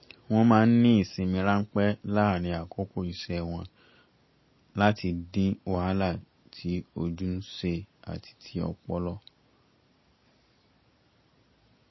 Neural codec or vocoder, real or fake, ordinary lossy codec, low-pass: none; real; MP3, 24 kbps; 7.2 kHz